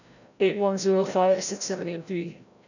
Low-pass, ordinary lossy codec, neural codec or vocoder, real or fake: 7.2 kHz; none; codec, 16 kHz, 0.5 kbps, FreqCodec, larger model; fake